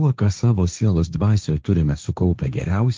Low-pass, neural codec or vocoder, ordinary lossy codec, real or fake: 7.2 kHz; codec, 16 kHz, 1.1 kbps, Voila-Tokenizer; Opus, 24 kbps; fake